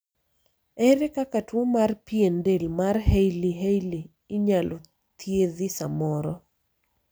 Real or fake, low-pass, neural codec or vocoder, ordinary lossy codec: real; none; none; none